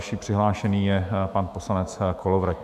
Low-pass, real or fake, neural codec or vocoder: 14.4 kHz; fake; autoencoder, 48 kHz, 128 numbers a frame, DAC-VAE, trained on Japanese speech